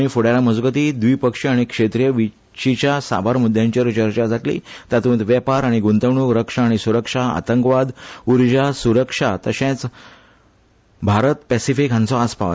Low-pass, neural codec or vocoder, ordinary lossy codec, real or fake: none; none; none; real